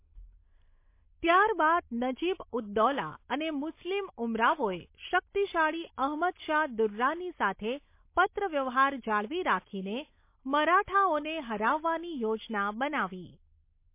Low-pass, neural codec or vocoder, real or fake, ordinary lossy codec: 3.6 kHz; none; real; MP3, 24 kbps